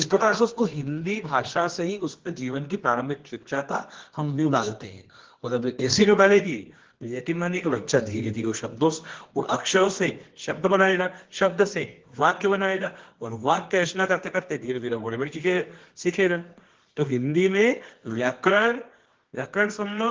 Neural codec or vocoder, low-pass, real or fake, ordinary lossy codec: codec, 24 kHz, 0.9 kbps, WavTokenizer, medium music audio release; 7.2 kHz; fake; Opus, 16 kbps